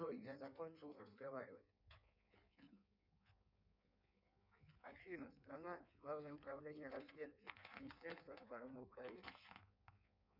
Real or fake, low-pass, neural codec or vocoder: fake; 5.4 kHz; codec, 16 kHz in and 24 kHz out, 1.1 kbps, FireRedTTS-2 codec